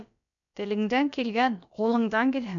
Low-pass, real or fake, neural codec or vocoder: 7.2 kHz; fake; codec, 16 kHz, about 1 kbps, DyCAST, with the encoder's durations